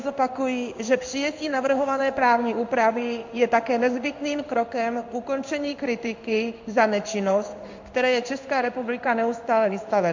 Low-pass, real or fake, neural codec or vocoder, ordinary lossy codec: 7.2 kHz; fake; codec, 16 kHz in and 24 kHz out, 1 kbps, XY-Tokenizer; MP3, 48 kbps